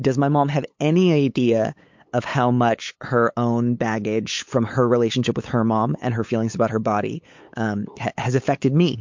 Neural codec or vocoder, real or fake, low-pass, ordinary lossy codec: codec, 16 kHz, 8 kbps, FunCodec, trained on LibriTTS, 25 frames a second; fake; 7.2 kHz; MP3, 48 kbps